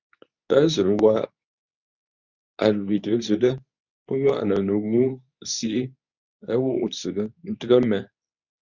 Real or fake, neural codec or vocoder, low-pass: fake; codec, 24 kHz, 0.9 kbps, WavTokenizer, medium speech release version 2; 7.2 kHz